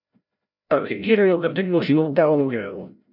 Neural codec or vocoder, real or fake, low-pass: codec, 16 kHz, 0.5 kbps, FreqCodec, larger model; fake; 5.4 kHz